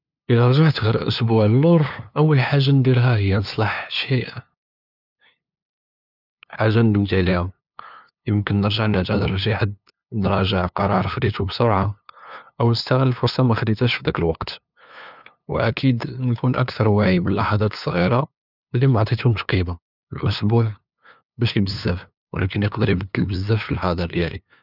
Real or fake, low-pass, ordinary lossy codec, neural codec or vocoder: fake; 5.4 kHz; none; codec, 16 kHz, 2 kbps, FunCodec, trained on LibriTTS, 25 frames a second